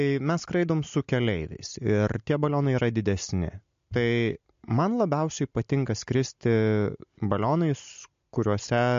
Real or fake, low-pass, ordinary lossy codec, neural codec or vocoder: real; 7.2 kHz; MP3, 48 kbps; none